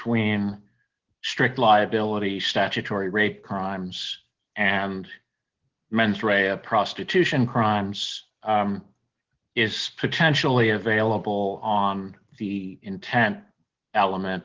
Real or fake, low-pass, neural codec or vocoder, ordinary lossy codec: real; 7.2 kHz; none; Opus, 16 kbps